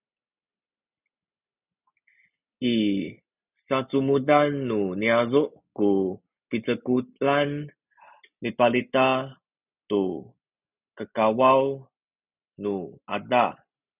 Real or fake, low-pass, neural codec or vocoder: real; 3.6 kHz; none